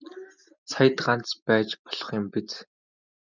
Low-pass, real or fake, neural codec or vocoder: 7.2 kHz; real; none